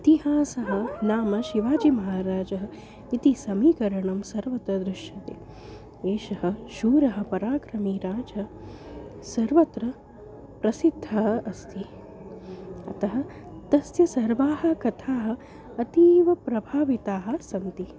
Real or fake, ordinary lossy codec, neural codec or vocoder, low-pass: real; none; none; none